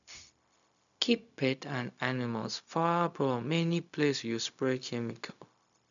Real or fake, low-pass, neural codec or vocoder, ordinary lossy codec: fake; 7.2 kHz; codec, 16 kHz, 0.4 kbps, LongCat-Audio-Codec; none